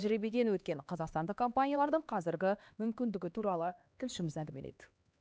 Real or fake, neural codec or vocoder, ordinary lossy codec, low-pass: fake; codec, 16 kHz, 2 kbps, X-Codec, HuBERT features, trained on LibriSpeech; none; none